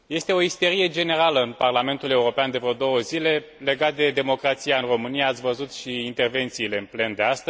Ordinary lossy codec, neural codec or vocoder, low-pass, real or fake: none; none; none; real